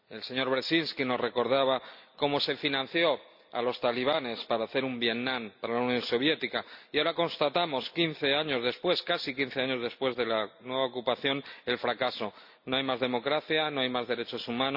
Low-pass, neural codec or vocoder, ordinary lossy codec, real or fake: 5.4 kHz; none; none; real